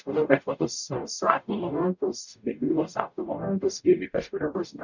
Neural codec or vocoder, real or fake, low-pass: codec, 44.1 kHz, 0.9 kbps, DAC; fake; 7.2 kHz